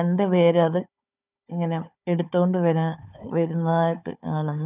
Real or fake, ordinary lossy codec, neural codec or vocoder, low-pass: fake; none; codec, 16 kHz, 4 kbps, FunCodec, trained on Chinese and English, 50 frames a second; 3.6 kHz